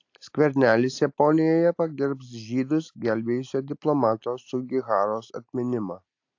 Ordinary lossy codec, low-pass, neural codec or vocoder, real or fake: AAC, 48 kbps; 7.2 kHz; none; real